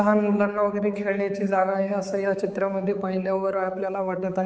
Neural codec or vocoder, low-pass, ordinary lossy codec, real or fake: codec, 16 kHz, 4 kbps, X-Codec, HuBERT features, trained on balanced general audio; none; none; fake